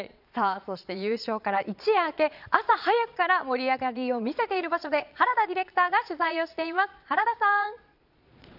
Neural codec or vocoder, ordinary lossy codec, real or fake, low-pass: vocoder, 22.05 kHz, 80 mel bands, Vocos; none; fake; 5.4 kHz